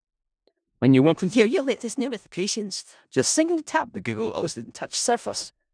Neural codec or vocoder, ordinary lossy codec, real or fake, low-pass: codec, 16 kHz in and 24 kHz out, 0.4 kbps, LongCat-Audio-Codec, four codebook decoder; none; fake; 9.9 kHz